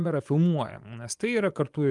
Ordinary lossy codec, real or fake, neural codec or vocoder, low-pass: Opus, 32 kbps; fake; vocoder, 24 kHz, 100 mel bands, Vocos; 10.8 kHz